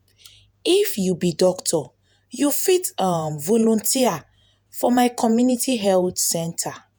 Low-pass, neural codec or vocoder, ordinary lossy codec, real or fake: none; vocoder, 48 kHz, 128 mel bands, Vocos; none; fake